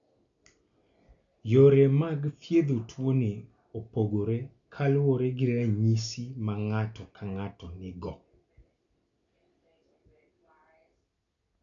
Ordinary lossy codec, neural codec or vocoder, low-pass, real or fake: none; none; 7.2 kHz; real